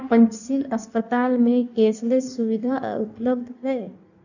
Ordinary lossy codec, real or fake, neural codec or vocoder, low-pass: none; fake; codec, 16 kHz in and 24 kHz out, 1.1 kbps, FireRedTTS-2 codec; 7.2 kHz